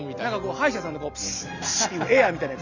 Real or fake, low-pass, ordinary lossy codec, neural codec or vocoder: real; 7.2 kHz; none; none